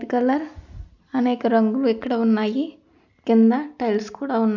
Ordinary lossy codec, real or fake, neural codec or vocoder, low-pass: none; real; none; 7.2 kHz